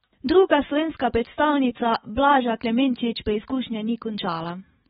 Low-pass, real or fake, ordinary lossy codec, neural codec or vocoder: 19.8 kHz; fake; AAC, 16 kbps; autoencoder, 48 kHz, 128 numbers a frame, DAC-VAE, trained on Japanese speech